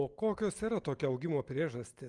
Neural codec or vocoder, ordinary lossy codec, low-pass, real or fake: none; Opus, 32 kbps; 10.8 kHz; real